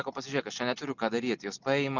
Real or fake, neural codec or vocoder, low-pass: real; none; 7.2 kHz